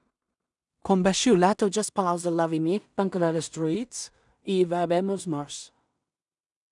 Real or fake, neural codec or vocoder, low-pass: fake; codec, 16 kHz in and 24 kHz out, 0.4 kbps, LongCat-Audio-Codec, two codebook decoder; 10.8 kHz